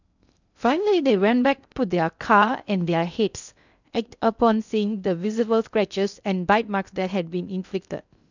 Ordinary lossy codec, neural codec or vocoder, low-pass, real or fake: none; codec, 16 kHz in and 24 kHz out, 0.6 kbps, FocalCodec, streaming, 2048 codes; 7.2 kHz; fake